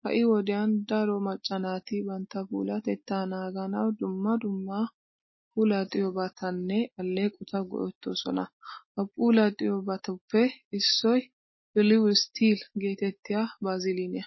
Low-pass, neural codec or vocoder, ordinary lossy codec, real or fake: 7.2 kHz; none; MP3, 24 kbps; real